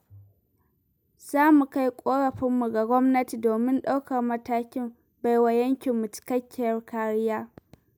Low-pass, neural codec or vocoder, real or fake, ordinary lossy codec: none; none; real; none